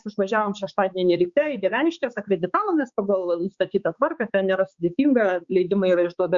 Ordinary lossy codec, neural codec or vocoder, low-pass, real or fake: Opus, 64 kbps; codec, 16 kHz, 4 kbps, X-Codec, HuBERT features, trained on balanced general audio; 7.2 kHz; fake